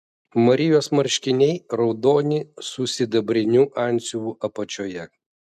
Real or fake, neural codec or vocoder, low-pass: real; none; 14.4 kHz